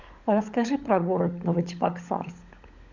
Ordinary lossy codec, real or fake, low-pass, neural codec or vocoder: Opus, 64 kbps; fake; 7.2 kHz; codec, 16 kHz, 16 kbps, FunCodec, trained on LibriTTS, 50 frames a second